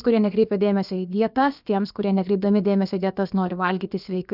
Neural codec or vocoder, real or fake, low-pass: codec, 16 kHz, about 1 kbps, DyCAST, with the encoder's durations; fake; 5.4 kHz